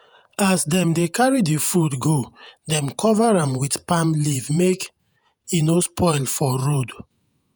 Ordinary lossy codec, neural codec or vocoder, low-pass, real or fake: none; vocoder, 48 kHz, 128 mel bands, Vocos; none; fake